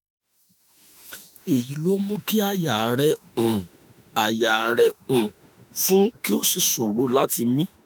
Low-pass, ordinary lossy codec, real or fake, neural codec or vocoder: none; none; fake; autoencoder, 48 kHz, 32 numbers a frame, DAC-VAE, trained on Japanese speech